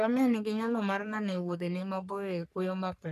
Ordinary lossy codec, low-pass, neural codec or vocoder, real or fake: none; 14.4 kHz; codec, 44.1 kHz, 3.4 kbps, Pupu-Codec; fake